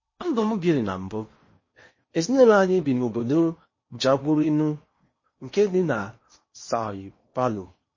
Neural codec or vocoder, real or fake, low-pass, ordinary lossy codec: codec, 16 kHz in and 24 kHz out, 0.8 kbps, FocalCodec, streaming, 65536 codes; fake; 7.2 kHz; MP3, 32 kbps